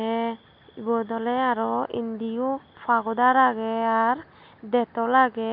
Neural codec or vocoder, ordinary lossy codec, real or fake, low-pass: none; none; real; 5.4 kHz